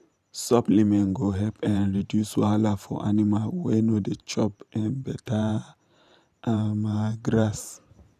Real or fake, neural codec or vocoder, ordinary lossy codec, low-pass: fake; vocoder, 44.1 kHz, 128 mel bands every 512 samples, BigVGAN v2; none; 14.4 kHz